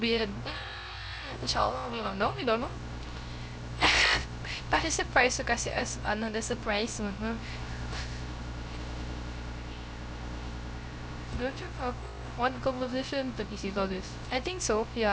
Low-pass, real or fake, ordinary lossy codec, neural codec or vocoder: none; fake; none; codec, 16 kHz, 0.3 kbps, FocalCodec